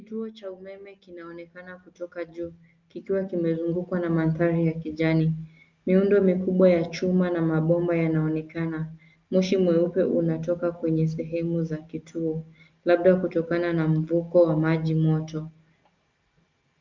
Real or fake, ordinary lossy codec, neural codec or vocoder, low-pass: real; Opus, 24 kbps; none; 7.2 kHz